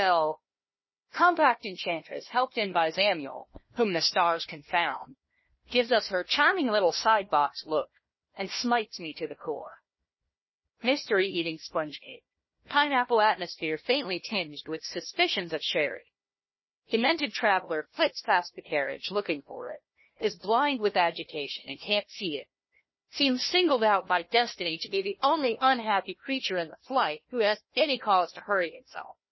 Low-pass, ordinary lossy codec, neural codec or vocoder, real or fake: 7.2 kHz; MP3, 24 kbps; codec, 16 kHz, 1 kbps, FunCodec, trained on Chinese and English, 50 frames a second; fake